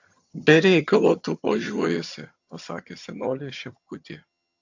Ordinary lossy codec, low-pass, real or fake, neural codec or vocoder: AAC, 48 kbps; 7.2 kHz; fake; vocoder, 22.05 kHz, 80 mel bands, HiFi-GAN